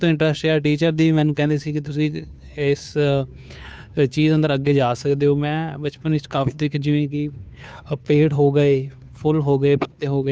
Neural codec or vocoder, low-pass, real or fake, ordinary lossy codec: codec, 16 kHz, 2 kbps, FunCodec, trained on Chinese and English, 25 frames a second; none; fake; none